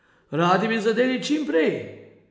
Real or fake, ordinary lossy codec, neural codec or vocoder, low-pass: real; none; none; none